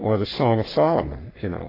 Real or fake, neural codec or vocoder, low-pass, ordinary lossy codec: fake; codec, 44.1 kHz, 3.4 kbps, Pupu-Codec; 5.4 kHz; AAC, 24 kbps